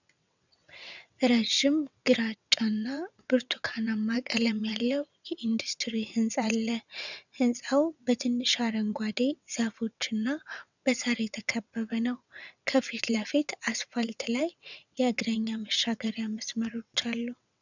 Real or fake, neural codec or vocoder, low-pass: fake; vocoder, 22.05 kHz, 80 mel bands, WaveNeXt; 7.2 kHz